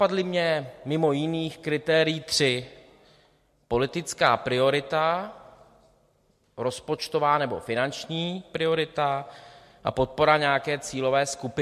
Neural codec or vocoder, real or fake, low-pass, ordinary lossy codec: none; real; 14.4 kHz; MP3, 64 kbps